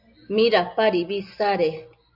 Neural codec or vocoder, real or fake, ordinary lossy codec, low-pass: none; real; AAC, 48 kbps; 5.4 kHz